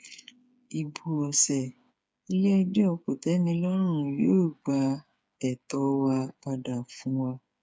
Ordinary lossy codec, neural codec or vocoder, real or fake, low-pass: none; codec, 16 kHz, 8 kbps, FreqCodec, smaller model; fake; none